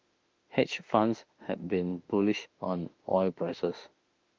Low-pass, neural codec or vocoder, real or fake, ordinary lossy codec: 7.2 kHz; autoencoder, 48 kHz, 32 numbers a frame, DAC-VAE, trained on Japanese speech; fake; Opus, 24 kbps